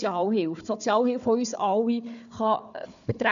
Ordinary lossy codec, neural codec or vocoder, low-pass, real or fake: none; codec, 16 kHz, 4 kbps, FunCodec, trained on Chinese and English, 50 frames a second; 7.2 kHz; fake